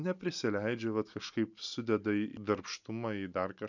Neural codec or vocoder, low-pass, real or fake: none; 7.2 kHz; real